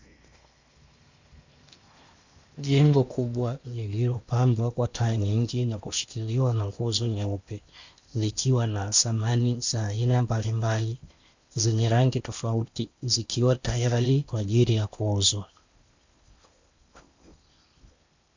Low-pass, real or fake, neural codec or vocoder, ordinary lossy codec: 7.2 kHz; fake; codec, 16 kHz in and 24 kHz out, 0.8 kbps, FocalCodec, streaming, 65536 codes; Opus, 64 kbps